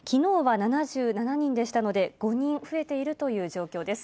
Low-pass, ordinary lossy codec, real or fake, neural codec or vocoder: none; none; real; none